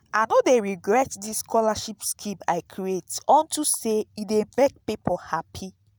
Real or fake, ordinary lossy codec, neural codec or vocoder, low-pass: real; none; none; none